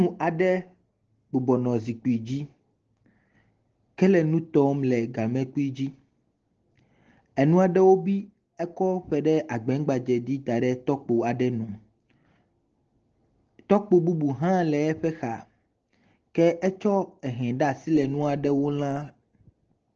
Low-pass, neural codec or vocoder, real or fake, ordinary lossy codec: 7.2 kHz; none; real; Opus, 16 kbps